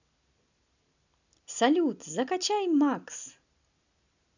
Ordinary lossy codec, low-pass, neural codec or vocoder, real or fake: none; 7.2 kHz; none; real